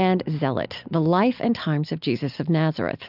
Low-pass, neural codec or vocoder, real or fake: 5.4 kHz; none; real